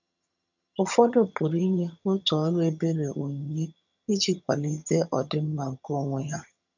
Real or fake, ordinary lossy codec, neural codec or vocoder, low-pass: fake; none; vocoder, 22.05 kHz, 80 mel bands, HiFi-GAN; 7.2 kHz